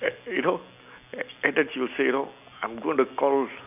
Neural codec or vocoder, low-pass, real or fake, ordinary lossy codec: none; 3.6 kHz; real; none